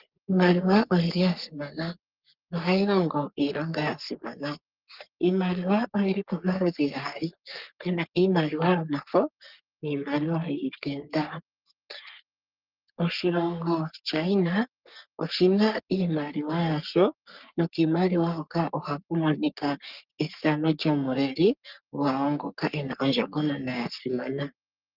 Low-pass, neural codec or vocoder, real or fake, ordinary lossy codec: 5.4 kHz; codec, 44.1 kHz, 3.4 kbps, Pupu-Codec; fake; Opus, 24 kbps